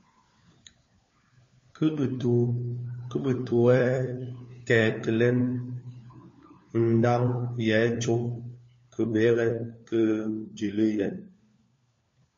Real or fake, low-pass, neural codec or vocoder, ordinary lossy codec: fake; 7.2 kHz; codec, 16 kHz, 4 kbps, FunCodec, trained on LibriTTS, 50 frames a second; MP3, 32 kbps